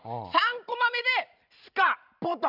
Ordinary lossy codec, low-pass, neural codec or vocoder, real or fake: none; 5.4 kHz; vocoder, 44.1 kHz, 128 mel bands every 512 samples, BigVGAN v2; fake